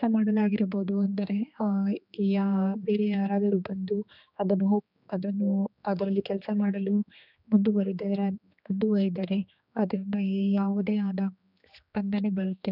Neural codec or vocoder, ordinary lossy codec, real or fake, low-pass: codec, 16 kHz, 2 kbps, X-Codec, HuBERT features, trained on general audio; none; fake; 5.4 kHz